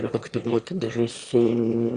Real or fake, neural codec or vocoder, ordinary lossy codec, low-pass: fake; autoencoder, 22.05 kHz, a latent of 192 numbers a frame, VITS, trained on one speaker; Opus, 64 kbps; 9.9 kHz